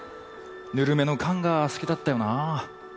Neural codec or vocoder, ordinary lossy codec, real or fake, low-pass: none; none; real; none